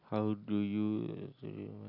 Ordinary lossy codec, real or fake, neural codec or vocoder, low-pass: none; real; none; 5.4 kHz